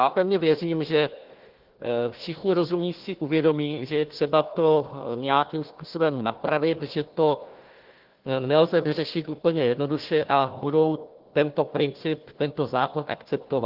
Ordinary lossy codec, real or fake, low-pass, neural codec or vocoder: Opus, 16 kbps; fake; 5.4 kHz; codec, 16 kHz, 1 kbps, FunCodec, trained on Chinese and English, 50 frames a second